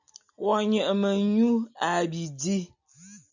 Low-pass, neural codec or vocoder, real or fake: 7.2 kHz; none; real